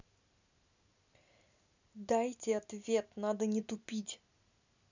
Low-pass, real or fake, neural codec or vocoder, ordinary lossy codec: 7.2 kHz; real; none; none